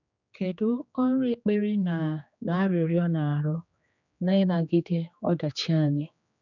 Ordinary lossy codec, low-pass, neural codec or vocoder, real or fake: none; 7.2 kHz; codec, 16 kHz, 2 kbps, X-Codec, HuBERT features, trained on general audio; fake